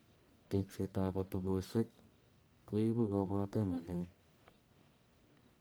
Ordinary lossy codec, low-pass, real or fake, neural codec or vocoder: none; none; fake; codec, 44.1 kHz, 1.7 kbps, Pupu-Codec